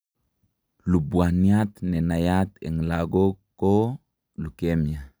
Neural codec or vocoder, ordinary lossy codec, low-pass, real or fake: none; none; none; real